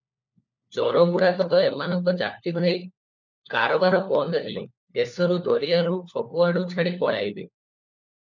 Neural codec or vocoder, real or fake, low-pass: codec, 16 kHz, 4 kbps, FunCodec, trained on LibriTTS, 50 frames a second; fake; 7.2 kHz